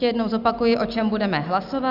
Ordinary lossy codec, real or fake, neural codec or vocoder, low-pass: Opus, 64 kbps; real; none; 5.4 kHz